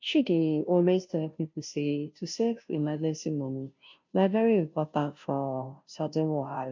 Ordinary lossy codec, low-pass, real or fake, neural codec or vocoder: MP3, 48 kbps; 7.2 kHz; fake; codec, 16 kHz, 0.5 kbps, FunCodec, trained on Chinese and English, 25 frames a second